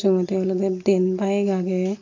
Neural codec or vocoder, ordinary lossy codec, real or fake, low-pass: none; AAC, 48 kbps; real; 7.2 kHz